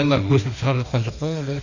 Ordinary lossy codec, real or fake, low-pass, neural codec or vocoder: none; fake; 7.2 kHz; autoencoder, 48 kHz, 32 numbers a frame, DAC-VAE, trained on Japanese speech